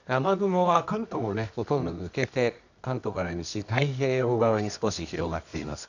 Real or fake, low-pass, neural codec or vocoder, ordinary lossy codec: fake; 7.2 kHz; codec, 24 kHz, 0.9 kbps, WavTokenizer, medium music audio release; none